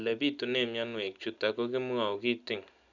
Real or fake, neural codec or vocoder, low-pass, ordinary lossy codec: real; none; 7.2 kHz; none